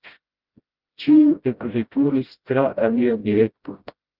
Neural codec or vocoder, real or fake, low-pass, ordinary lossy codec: codec, 16 kHz, 0.5 kbps, FreqCodec, smaller model; fake; 5.4 kHz; Opus, 32 kbps